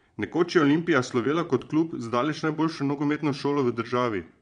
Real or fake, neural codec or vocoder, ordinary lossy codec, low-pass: fake; vocoder, 22.05 kHz, 80 mel bands, Vocos; MP3, 64 kbps; 9.9 kHz